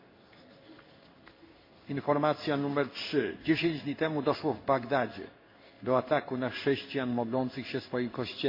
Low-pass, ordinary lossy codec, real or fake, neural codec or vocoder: 5.4 kHz; MP3, 32 kbps; fake; codec, 16 kHz in and 24 kHz out, 1 kbps, XY-Tokenizer